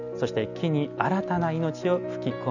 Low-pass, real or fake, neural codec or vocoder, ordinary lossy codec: 7.2 kHz; real; none; none